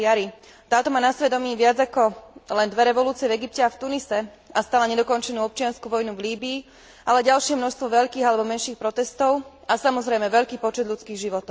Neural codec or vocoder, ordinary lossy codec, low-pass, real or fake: none; none; none; real